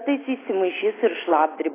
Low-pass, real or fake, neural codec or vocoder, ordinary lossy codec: 3.6 kHz; real; none; AAC, 16 kbps